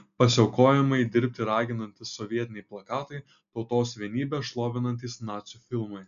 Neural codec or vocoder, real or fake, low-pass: none; real; 7.2 kHz